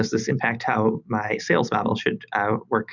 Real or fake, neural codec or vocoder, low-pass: real; none; 7.2 kHz